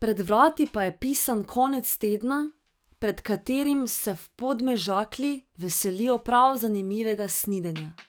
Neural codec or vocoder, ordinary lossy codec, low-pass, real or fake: codec, 44.1 kHz, 7.8 kbps, DAC; none; none; fake